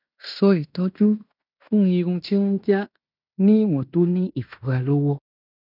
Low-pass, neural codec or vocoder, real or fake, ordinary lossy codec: 5.4 kHz; codec, 16 kHz in and 24 kHz out, 0.9 kbps, LongCat-Audio-Codec, fine tuned four codebook decoder; fake; none